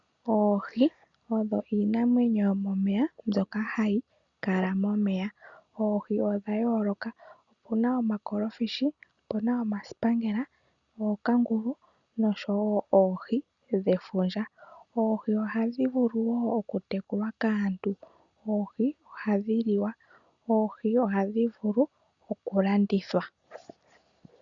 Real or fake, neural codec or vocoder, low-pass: real; none; 7.2 kHz